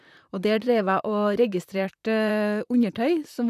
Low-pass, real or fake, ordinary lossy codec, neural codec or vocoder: 14.4 kHz; fake; none; vocoder, 44.1 kHz, 128 mel bands, Pupu-Vocoder